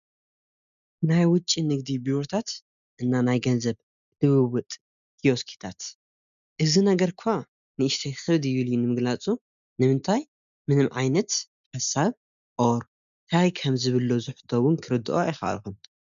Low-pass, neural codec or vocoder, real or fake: 7.2 kHz; none; real